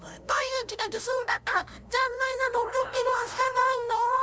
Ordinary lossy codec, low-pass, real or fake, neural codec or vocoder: none; none; fake; codec, 16 kHz, 0.5 kbps, FunCodec, trained on LibriTTS, 25 frames a second